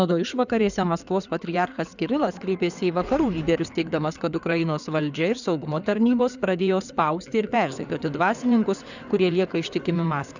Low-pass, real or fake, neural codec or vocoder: 7.2 kHz; fake; codec, 16 kHz in and 24 kHz out, 2.2 kbps, FireRedTTS-2 codec